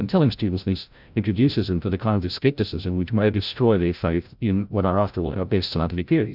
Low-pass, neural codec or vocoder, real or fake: 5.4 kHz; codec, 16 kHz, 0.5 kbps, FreqCodec, larger model; fake